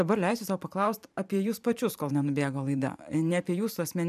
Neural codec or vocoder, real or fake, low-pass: vocoder, 44.1 kHz, 128 mel bands every 512 samples, BigVGAN v2; fake; 14.4 kHz